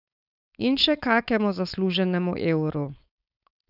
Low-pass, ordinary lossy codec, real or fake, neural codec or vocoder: 5.4 kHz; none; fake; codec, 16 kHz, 4.8 kbps, FACodec